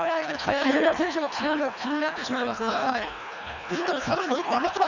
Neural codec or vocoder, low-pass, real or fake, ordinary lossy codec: codec, 24 kHz, 1.5 kbps, HILCodec; 7.2 kHz; fake; none